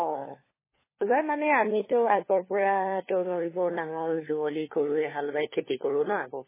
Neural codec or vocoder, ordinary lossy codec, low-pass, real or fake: codec, 16 kHz, 4 kbps, FunCodec, trained on LibriTTS, 50 frames a second; MP3, 16 kbps; 3.6 kHz; fake